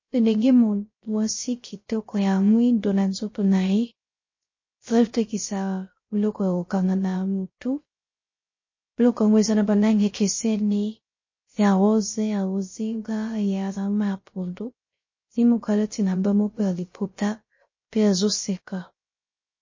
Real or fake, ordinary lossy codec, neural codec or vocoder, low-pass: fake; MP3, 32 kbps; codec, 16 kHz, 0.3 kbps, FocalCodec; 7.2 kHz